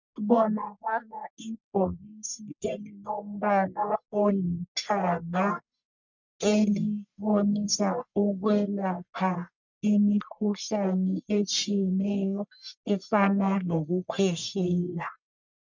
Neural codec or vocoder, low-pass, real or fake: codec, 44.1 kHz, 1.7 kbps, Pupu-Codec; 7.2 kHz; fake